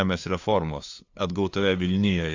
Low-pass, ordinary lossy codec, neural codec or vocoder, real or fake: 7.2 kHz; AAC, 48 kbps; codec, 16 kHz, 2 kbps, FunCodec, trained on LibriTTS, 25 frames a second; fake